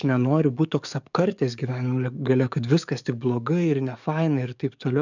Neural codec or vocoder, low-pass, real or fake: codec, 44.1 kHz, 7.8 kbps, DAC; 7.2 kHz; fake